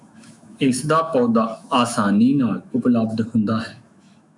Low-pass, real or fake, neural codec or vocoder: 10.8 kHz; fake; autoencoder, 48 kHz, 128 numbers a frame, DAC-VAE, trained on Japanese speech